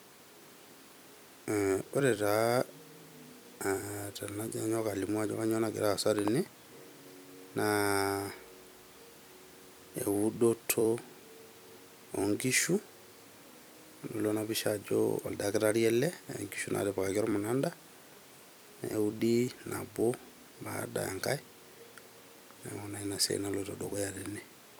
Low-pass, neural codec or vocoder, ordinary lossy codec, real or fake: none; vocoder, 44.1 kHz, 128 mel bands every 256 samples, BigVGAN v2; none; fake